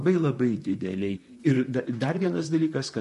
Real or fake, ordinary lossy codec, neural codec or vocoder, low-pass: fake; MP3, 48 kbps; vocoder, 44.1 kHz, 128 mel bands, Pupu-Vocoder; 14.4 kHz